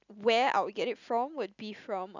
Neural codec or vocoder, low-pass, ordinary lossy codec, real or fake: none; 7.2 kHz; none; real